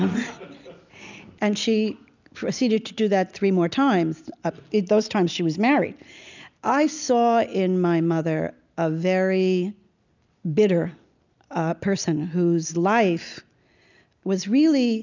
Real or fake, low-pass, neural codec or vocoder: real; 7.2 kHz; none